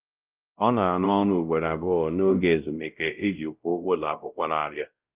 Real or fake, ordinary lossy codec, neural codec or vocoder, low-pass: fake; Opus, 24 kbps; codec, 16 kHz, 0.5 kbps, X-Codec, WavLM features, trained on Multilingual LibriSpeech; 3.6 kHz